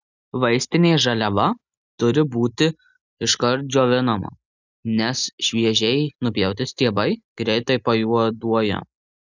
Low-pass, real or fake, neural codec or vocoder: 7.2 kHz; real; none